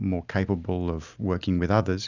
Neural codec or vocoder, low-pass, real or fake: none; 7.2 kHz; real